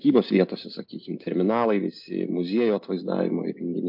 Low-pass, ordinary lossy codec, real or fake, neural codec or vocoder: 5.4 kHz; MP3, 48 kbps; real; none